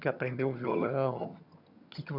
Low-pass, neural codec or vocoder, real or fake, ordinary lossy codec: 5.4 kHz; vocoder, 22.05 kHz, 80 mel bands, HiFi-GAN; fake; none